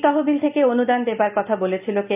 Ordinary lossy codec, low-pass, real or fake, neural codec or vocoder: none; 3.6 kHz; real; none